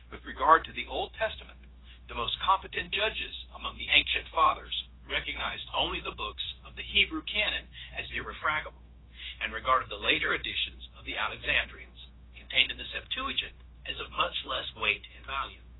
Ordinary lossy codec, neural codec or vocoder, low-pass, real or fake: AAC, 16 kbps; codec, 16 kHz, 0.9 kbps, LongCat-Audio-Codec; 7.2 kHz; fake